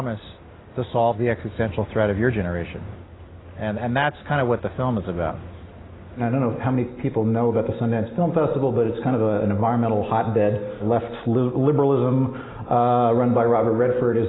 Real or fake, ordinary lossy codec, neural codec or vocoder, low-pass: real; AAC, 16 kbps; none; 7.2 kHz